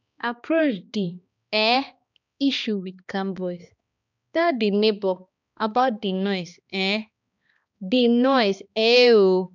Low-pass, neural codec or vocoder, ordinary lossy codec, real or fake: 7.2 kHz; codec, 16 kHz, 2 kbps, X-Codec, HuBERT features, trained on balanced general audio; none; fake